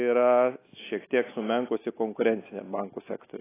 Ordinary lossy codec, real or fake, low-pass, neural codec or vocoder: AAC, 16 kbps; real; 3.6 kHz; none